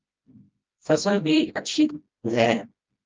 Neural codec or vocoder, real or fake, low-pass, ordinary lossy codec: codec, 16 kHz, 1 kbps, FreqCodec, smaller model; fake; 7.2 kHz; Opus, 24 kbps